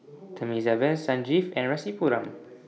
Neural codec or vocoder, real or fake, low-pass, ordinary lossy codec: none; real; none; none